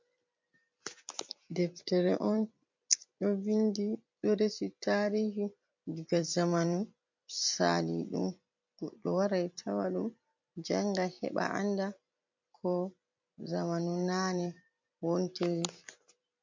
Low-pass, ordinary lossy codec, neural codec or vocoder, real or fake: 7.2 kHz; MP3, 48 kbps; vocoder, 44.1 kHz, 128 mel bands every 256 samples, BigVGAN v2; fake